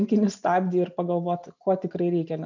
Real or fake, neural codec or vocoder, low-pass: real; none; 7.2 kHz